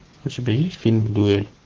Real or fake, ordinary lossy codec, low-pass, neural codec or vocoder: fake; Opus, 16 kbps; 7.2 kHz; codec, 44.1 kHz, 7.8 kbps, Pupu-Codec